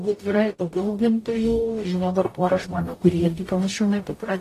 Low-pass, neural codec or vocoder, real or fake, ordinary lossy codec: 14.4 kHz; codec, 44.1 kHz, 0.9 kbps, DAC; fake; AAC, 48 kbps